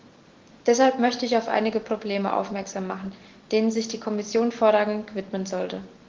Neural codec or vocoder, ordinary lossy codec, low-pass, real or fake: none; Opus, 16 kbps; 7.2 kHz; real